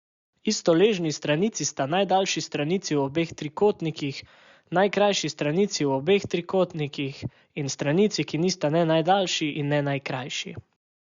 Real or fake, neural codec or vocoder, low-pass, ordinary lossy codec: real; none; 7.2 kHz; Opus, 64 kbps